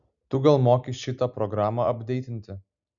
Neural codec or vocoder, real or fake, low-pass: none; real; 7.2 kHz